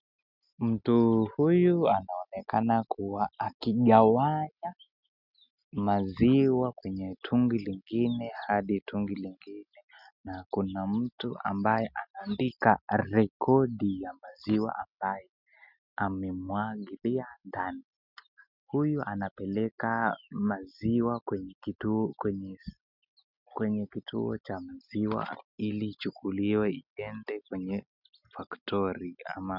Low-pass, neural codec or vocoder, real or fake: 5.4 kHz; none; real